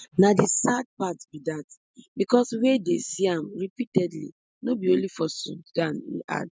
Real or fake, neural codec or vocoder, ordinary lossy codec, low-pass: real; none; none; none